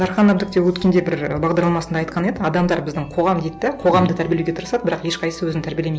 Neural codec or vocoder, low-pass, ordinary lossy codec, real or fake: none; none; none; real